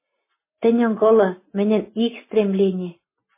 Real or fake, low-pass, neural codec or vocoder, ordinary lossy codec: real; 3.6 kHz; none; MP3, 16 kbps